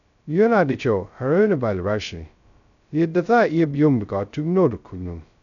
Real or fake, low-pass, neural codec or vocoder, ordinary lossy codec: fake; 7.2 kHz; codec, 16 kHz, 0.2 kbps, FocalCodec; none